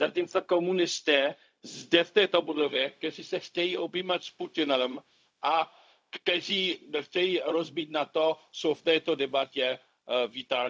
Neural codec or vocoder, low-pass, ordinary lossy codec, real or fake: codec, 16 kHz, 0.4 kbps, LongCat-Audio-Codec; none; none; fake